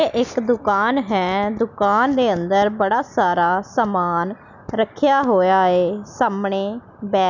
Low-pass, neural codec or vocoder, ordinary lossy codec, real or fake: 7.2 kHz; none; none; real